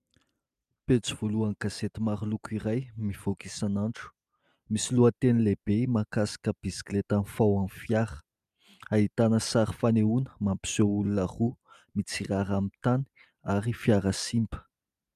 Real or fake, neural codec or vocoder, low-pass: real; none; 14.4 kHz